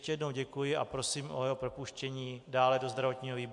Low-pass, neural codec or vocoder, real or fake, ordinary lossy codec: 10.8 kHz; none; real; MP3, 64 kbps